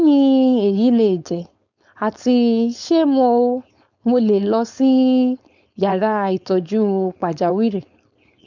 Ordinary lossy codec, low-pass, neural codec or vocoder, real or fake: none; 7.2 kHz; codec, 16 kHz, 4.8 kbps, FACodec; fake